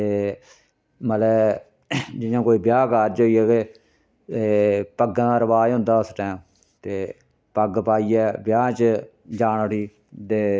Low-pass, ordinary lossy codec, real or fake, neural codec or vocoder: none; none; real; none